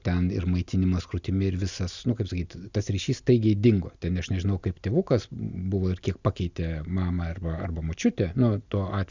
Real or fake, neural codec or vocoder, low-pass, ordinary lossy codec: real; none; 7.2 kHz; Opus, 64 kbps